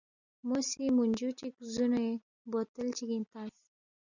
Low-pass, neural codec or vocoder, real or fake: 7.2 kHz; none; real